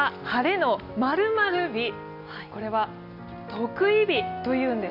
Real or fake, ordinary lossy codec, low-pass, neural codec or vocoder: real; none; 5.4 kHz; none